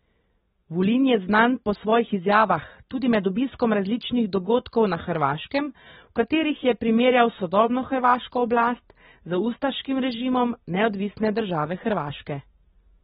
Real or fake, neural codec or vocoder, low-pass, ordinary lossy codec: real; none; 7.2 kHz; AAC, 16 kbps